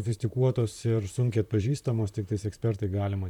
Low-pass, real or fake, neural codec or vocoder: 19.8 kHz; fake; vocoder, 44.1 kHz, 128 mel bands, Pupu-Vocoder